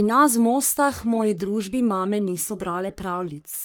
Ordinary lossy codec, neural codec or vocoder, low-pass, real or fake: none; codec, 44.1 kHz, 3.4 kbps, Pupu-Codec; none; fake